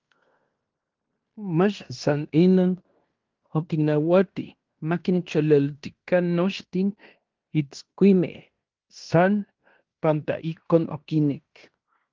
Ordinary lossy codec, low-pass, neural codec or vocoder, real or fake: Opus, 32 kbps; 7.2 kHz; codec, 16 kHz in and 24 kHz out, 0.9 kbps, LongCat-Audio-Codec, fine tuned four codebook decoder; fake